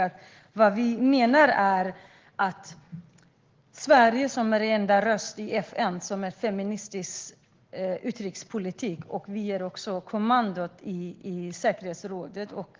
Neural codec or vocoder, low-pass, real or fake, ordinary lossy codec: none; 7.2 kHz; real; Opus, 16 kbps